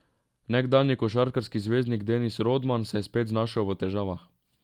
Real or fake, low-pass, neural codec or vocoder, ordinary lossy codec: real; 19.8 kHz; none; Opus, 32 kbps